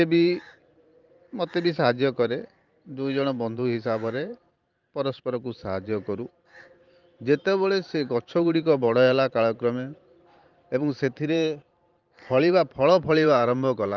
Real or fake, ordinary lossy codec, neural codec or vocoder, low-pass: fake; Opus, 32 kbps; vocoder, 44.1 kHz, 128 mel bands every 512 samples, BigVGAN v2; 7.2 kHz